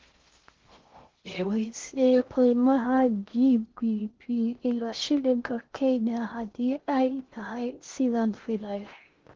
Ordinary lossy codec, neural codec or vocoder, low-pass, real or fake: Opus, 16 kbps; codec, 16 kHz in and 24 kHz out, 0.6 kbps, FocalCodec, streaming, 4096 codes; 7.2 kHz; fake